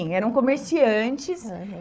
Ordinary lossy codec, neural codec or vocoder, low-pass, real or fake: none; codec, 16 kHz, 4 kbps, FunCodec, trained on Chinese and English, 50 frames a second; none; fake